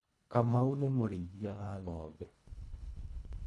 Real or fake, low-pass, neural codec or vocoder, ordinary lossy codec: fake; none; codec, 24 kHz, 1.5 kbps, HILCodec; none